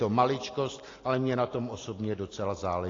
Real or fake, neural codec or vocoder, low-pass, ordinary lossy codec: real; none; 7.2 kHz; AAC, 32 kbps